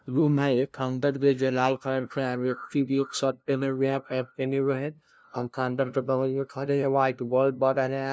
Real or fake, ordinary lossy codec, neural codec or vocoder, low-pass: fake; none; codec, 16 kHz, 0.5 kbps, FunCodec, trained on LibriTTS, 25 frames a second; none